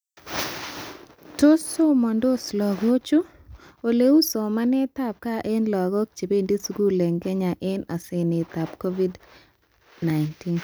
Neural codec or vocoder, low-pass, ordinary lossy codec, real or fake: none; none; none; real